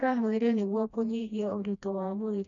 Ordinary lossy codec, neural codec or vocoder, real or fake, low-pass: none; codec, 16 kHz, 1 kbps, FreqCodec, smaller model; fake; 7.2 kHz